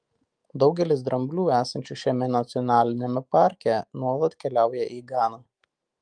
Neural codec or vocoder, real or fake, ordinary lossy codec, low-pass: autoencoder, 48 kHz, 128 numbers a frame, DAC-VAE, trained on Japanese speech; fake; Opus, 32 kbps; 9.9 kHz